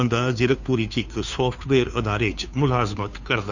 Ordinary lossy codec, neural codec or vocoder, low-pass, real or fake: none; codec, 16 kHz, 2 kbps, FunCodec, trained on Chinese and English, 25 frames a second; 7.2 kHz; fake